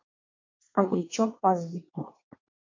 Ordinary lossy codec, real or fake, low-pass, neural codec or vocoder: MP3, 48 kbps; fake; 7.2 kHz; codec, 24 kHz, 1 kbps, SNAC